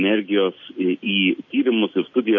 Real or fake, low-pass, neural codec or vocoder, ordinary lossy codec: real; 7.2 kHz; none; MP3, 32 kbps